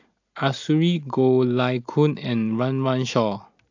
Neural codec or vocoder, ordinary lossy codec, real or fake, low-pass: none; AAC, 48 kbps; real; 7.2 kHz